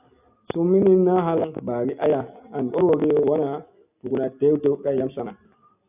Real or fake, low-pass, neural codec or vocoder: real; 3.6 kHz; none